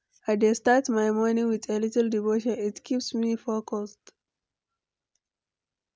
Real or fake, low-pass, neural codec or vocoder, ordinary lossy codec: real; none; none; none